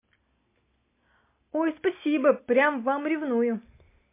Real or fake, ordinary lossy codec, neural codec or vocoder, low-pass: real; MP3, 24 kbps; none; 3.6 kHz